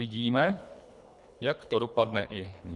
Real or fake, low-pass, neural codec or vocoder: fake; 10.8 kHz; codec, 24 kHz, 3 kbps, HILCodec